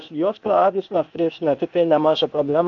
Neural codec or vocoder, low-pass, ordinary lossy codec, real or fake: codec, 16 kHz, 0.8 kbps, ZipCodec; 7.2 kHz; MP3, 64 kbps; fake